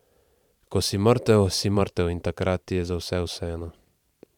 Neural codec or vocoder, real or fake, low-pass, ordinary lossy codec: vocoder, 44.1 kHz, 128 mel bands every 512 samples, BigVGAN v2; fake; 19.8 kHz; none